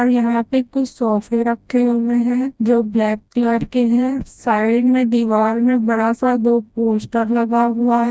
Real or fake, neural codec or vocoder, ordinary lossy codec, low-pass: fake; codec, 16 kHz, 1 kbps, FreqCodec, smaller model; none; none